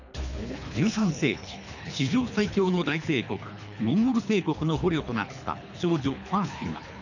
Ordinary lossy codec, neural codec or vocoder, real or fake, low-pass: none; codec, 24 kHz, 3 kbps, HILCodec; fake; 7.2 kHz